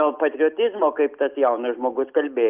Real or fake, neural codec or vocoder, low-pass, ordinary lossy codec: real; none; 3.6 kHz; Opus, 24 kbps